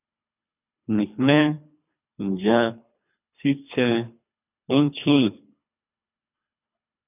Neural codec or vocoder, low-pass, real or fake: codec, 24 kHz, 3 kbps, HILCodec; 3.6 kHz; fake